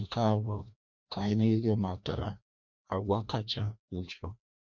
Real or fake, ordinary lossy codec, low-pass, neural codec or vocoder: fake; none; 7.2 kHz; codec, 16 kHz, 1 kbps, FreqCodec, larger model